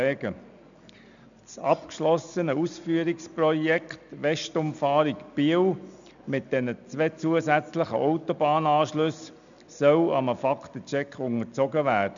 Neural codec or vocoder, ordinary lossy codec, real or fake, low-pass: none; MP3, 96 kbps; real; 7.2 kHz